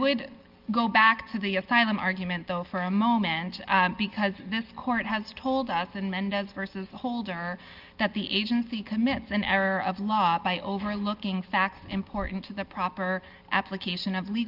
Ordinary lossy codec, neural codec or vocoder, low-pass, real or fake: Opus, 24 kbps; none; 5.4 kHz; real